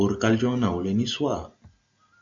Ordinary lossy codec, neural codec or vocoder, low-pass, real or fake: AAC, 64 kbps; none; 7.2 kHz; real